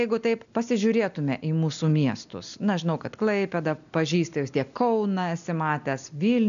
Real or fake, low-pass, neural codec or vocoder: real; 7.2 kHz; none